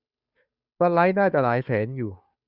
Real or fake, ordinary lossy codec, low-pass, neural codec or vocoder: fake; Opus, 64 kbps; 5.4 kHz; codec, 16 kHz, 2 kbps, FunCodec, trained on Chinese and English, 25 frames a second